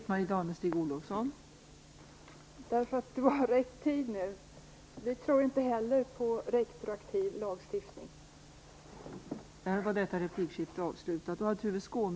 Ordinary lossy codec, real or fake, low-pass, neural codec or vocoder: none; real; none; none